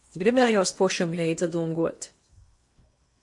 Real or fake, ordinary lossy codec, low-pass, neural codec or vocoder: fake; MP3, 48 kbps; 10.8 kHz; codec, 16 kHz in and 24 kHz out, 0.8 kbps, FocalCodec, streaming, 65536 codes